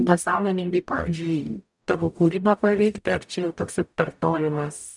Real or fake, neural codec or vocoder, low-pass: fake; codec, 44.1 kHz, 0.9 kbps, DAC; 10.8 kHz